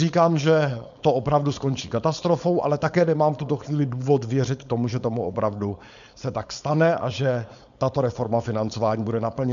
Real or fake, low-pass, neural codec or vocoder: fake; 7.2 kHz; codec, 16 kHz, 4.8 kbps, FACodec